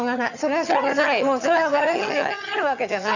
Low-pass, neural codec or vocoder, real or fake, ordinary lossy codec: 7.2 kHz; vocoder, 22.05 kHz, 80 mel bands, HiFi-GAN; fake; none